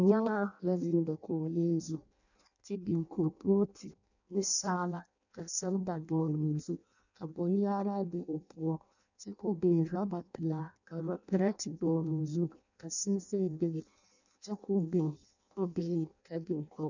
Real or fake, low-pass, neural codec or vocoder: fake; 7.2 kHz; codec, 16 kHz in and 24 kHz out, 0.6 kbps, FireRedTTS-2 codec